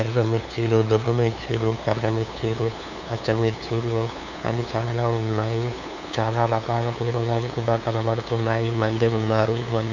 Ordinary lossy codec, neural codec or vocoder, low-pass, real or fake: none; codec, 16 kHz, 2 kbps, FunCodec, trained on LibriTTS, 25 frames a second; 7.2 kHz; fake